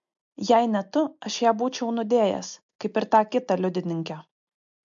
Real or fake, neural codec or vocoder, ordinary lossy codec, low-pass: real; none; MP3, 48 kbps; 7.2 kHz